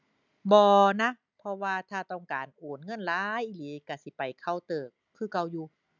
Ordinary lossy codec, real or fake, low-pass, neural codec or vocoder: none; real; 7.2 kHz; none